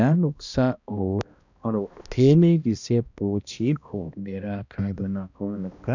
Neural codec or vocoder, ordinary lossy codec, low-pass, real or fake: codec, 16 kHz, 1 kbps, X-Codec, HuBERT features, trained on balanced general audio; none; 7.2 kHz; fake